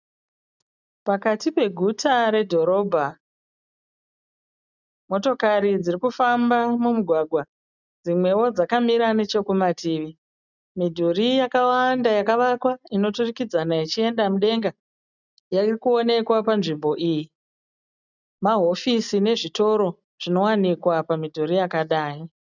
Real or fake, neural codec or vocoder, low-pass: real; none; 7.2 kHz